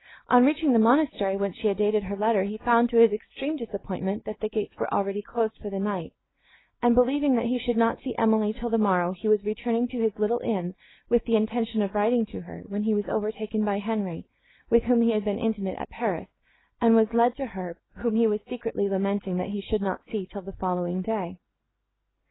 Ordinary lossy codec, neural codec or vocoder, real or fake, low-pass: AAC, 16 kbps; none; real; 7.2 kHz